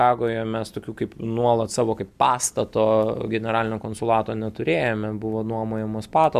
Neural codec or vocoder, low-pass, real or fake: none; 14.4 kHz; real